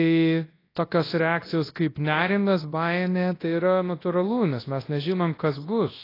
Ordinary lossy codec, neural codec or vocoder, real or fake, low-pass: AAC, 24 kbps; codec, 24 kHz, 0.9 kbps, WavTokenizer, large speech release; fake; 5.4 kHz